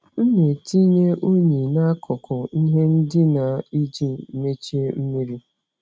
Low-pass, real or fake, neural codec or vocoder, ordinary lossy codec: none; real; none; none